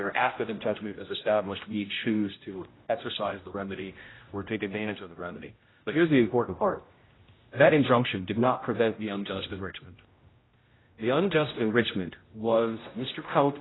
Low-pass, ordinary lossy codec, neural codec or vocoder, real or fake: 7.2 kHz; AAC, 16 kbps; codec, 16 kHz, 0.5 kbps, X-Codec, HuBERT features, trained on general audio; fake